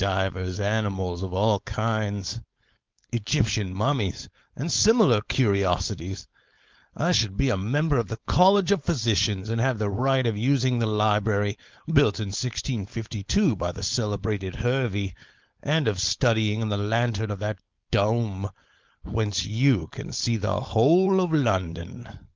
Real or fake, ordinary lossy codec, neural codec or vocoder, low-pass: fake; Opus, 24 kbps; codec, 16 kHz, 4.8 kbps, FACodec; 7.2 kHz